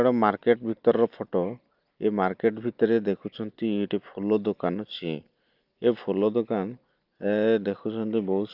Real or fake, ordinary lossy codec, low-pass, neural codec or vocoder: real; Opus, 32 kbps; 5.4 kHz; none